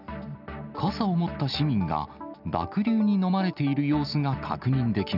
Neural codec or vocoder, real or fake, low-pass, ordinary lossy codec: none; real; 5.4 kHz; none